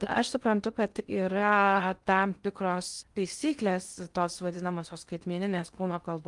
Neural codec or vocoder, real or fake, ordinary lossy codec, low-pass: codec, 16 kHz in and 24 kHz out, 0.6 kbps, FocalCodec, streaming, 2048 codes; fake; Opus, 32 kbps; 10.8 kHz